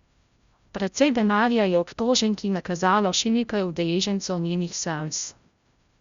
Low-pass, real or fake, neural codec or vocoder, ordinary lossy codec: 7.2 kHz; fake; codec, 16 kHz, 0.5 kbps, FreqCodec, larger model; Opus, 64 kbps